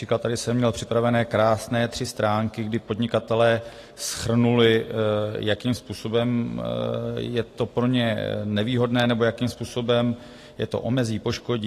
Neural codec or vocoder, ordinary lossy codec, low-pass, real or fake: none; AAC, 48 kbps; 14.4 kHz; real